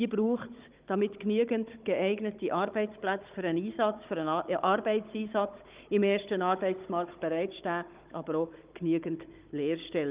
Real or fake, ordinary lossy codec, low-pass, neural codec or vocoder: fake; Opus, 32 kbps; 3.6 kHz; codec, 16 kHz, 16 kbps, FunCodec, trained on Chinese and English, 50 frames a second